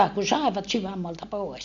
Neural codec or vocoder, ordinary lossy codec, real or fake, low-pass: none; MP3, 64 kbps; real; 7.2 kHz